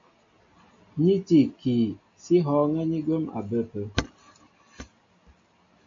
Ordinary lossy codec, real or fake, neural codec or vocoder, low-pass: MP3, 48 kbps; real; none; 7.2 kHz